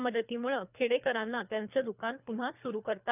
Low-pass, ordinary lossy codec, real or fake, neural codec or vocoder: 3.6 kHz; none; fake; codec, 24 kHz, 3 kbps, HILCodec